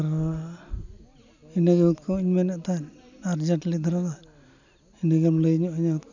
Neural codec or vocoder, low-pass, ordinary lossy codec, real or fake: none; 7.2 kHz; none; real